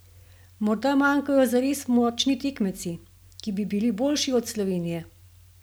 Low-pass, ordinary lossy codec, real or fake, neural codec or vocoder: none; none; real; none